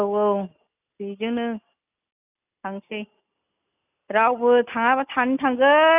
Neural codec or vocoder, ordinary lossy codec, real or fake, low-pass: none; none; real; 3.6 kHz